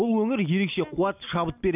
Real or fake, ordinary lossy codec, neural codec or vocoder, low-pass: real; none; none; 3.6 kHz